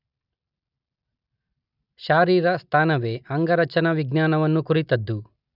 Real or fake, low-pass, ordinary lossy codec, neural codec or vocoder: real; 5.4 kHz; none; none